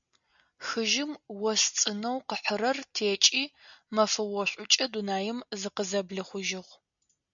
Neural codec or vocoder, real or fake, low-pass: none; real; 7.2 kHz